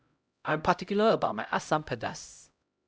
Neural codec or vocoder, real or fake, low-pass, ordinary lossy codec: codec, 16 kHz, 0.5 kbps, X-Codec, HuBERT features, trained on LibriSpeech; fake; none; none